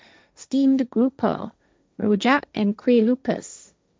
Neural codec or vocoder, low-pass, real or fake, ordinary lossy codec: codec, 16 kHz, 1.1 kbps, Voila-Tokenizer; none; fake; none